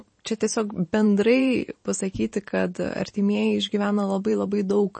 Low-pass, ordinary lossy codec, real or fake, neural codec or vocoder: 9.9 kHz; MP3, 32 kbps; fake; vocoder, 44.1 kHz, 128 mel bands every 512 samples, BigVGAN v2